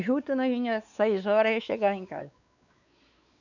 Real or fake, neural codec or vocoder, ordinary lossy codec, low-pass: fake; codec, 16 kHz, 4 kbps, X-Codec, WavLM features, trained on Multilingual LibriSpeech; none; 7.2 kHz